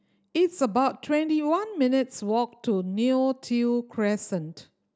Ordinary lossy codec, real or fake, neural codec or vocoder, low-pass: none; real; none; none